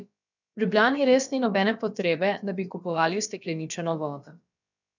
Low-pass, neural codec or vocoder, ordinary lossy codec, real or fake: 7.2 kHz; codec, 16 kHz, about 1 kbps, DyCAST, with the encoder's durations; none; fake